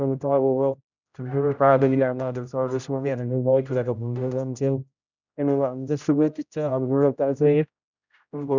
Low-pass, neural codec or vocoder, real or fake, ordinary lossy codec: 7.2 kHz; codec, 16 kHz, 0.5 kbps, X-Codec, HuBERT features, trained on general audio; fake; none